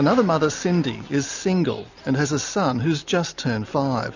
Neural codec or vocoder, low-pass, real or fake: none; 7.2 kHz; real